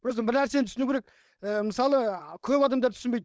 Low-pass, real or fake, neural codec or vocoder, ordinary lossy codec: none; fake; codec, 16 kHz, 4.8 kbps, FACodec; none